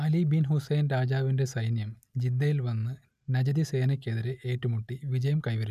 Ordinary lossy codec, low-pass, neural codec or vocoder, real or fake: none; 14.4 kHz; none; real